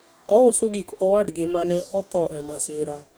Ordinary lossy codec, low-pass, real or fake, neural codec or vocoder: none; none; fake; codec, 44.1 kHz, 2.6 kbps, DAC